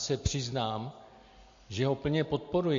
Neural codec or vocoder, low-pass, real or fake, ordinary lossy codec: none; 7.2 kHz; real; MP3, 48 kbps